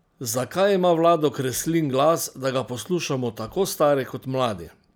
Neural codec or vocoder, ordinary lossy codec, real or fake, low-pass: none; none; real; none